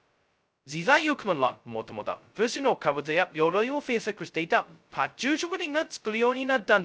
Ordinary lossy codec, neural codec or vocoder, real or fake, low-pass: none; codec, 16 kHz, 0.2 kbps, FocalCodec; fake; none